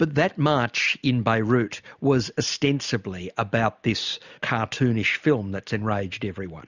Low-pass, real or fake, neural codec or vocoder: 7.2 kHz; real; none